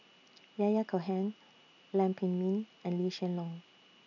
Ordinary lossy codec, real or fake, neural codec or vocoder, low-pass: none; real; none; 7.2 kHz